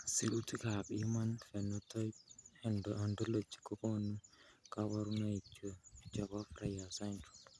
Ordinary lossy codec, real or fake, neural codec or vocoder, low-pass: none; real; none; none